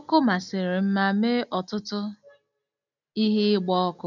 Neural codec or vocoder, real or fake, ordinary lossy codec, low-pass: none; real; none; 7.2 kHz